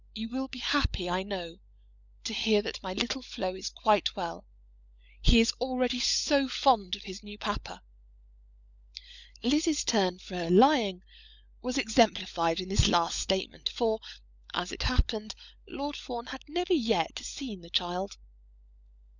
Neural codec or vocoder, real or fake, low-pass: codec, 16 kHz, 16 kbps, FunCodec, trained on LibriTTS, 50 frames a second; fake; 7.2 kHz